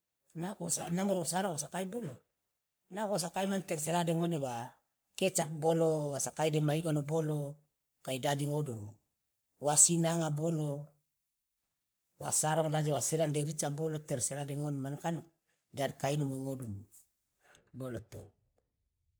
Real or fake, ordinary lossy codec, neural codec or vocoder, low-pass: fake; none; codec, 44.1 kHz, 3.4 kbps, Pupu-Codec; none